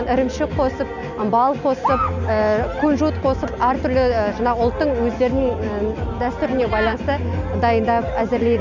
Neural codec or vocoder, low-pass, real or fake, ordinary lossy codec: none; 7.2 kHz; real; none